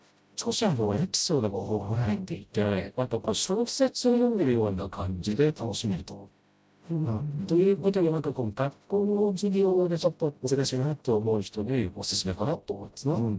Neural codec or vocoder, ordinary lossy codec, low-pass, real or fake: codec, 16 kHz, 0.5 kbps, FreqCodec, smaller model; none; none; fake